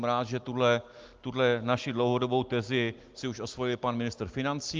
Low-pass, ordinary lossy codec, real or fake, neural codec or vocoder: 7.2 kHz; Opus, 32 kbps; real; none